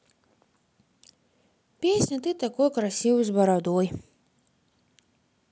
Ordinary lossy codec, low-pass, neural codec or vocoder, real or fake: none; none; none; real